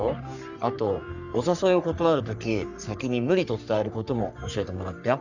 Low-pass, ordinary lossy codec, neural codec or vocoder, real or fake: 7.2 kHz; none; codec, 44.1 kHz, 3.4 kbps, Pupu-Codec; fake